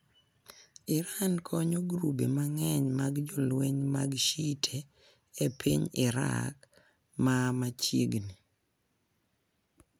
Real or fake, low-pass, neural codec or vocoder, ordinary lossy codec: real; none; none; none